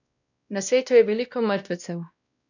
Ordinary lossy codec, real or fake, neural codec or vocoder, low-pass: none; fake; codec, 16 kHz, 1 kbps, X-Codec, WavLM features, trained on Multilingual LibriSpeech; 7.2 kHz